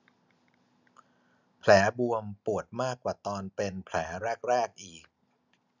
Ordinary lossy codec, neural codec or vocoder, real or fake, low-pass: none; none; real; 7.2 kHz